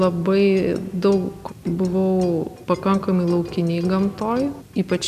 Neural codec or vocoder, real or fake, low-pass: none; real; 14.4 kHz